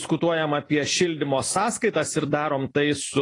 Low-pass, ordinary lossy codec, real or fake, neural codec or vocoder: 10.8 kHz; AAC, 32 kbps; fake; vocoder, 44.1 kHz, 128 mel bands every 256 samples, BigVGAN v2